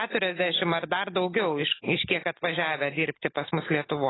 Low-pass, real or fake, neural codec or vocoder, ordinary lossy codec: 7.2 kHz; real; none; AAC, 16 kbps